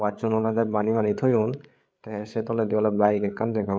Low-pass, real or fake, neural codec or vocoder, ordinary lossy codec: none; fake; codec, 16 kHz, 8 kbps, FreqCodec, larger model; none